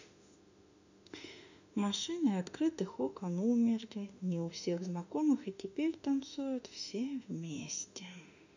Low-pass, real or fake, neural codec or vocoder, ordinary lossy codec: 7.2 kHz; fake; autoencoder, 48 kHz, 32 numbers a frame, DAC-VAE, trained on Japanese speech; none